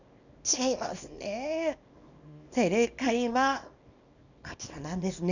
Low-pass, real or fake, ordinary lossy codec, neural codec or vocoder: 7.2 kHz; fake; none; codec, 24 kHz, 0.9 kbps, WavTokenizer, small release